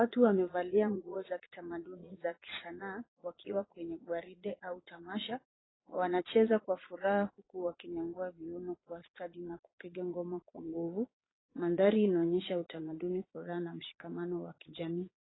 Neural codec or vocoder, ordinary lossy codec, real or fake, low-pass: vocoder, 22.05 kHz, 80 mel bands, Vocos; AAC, 16 kbps; fake; 7.2 kHz